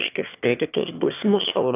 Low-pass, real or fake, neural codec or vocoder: 3.6 kHz; fake; autoencoder, 22.05 kHz, a latent of 192 numbers a frame, VITS, trained on one speaker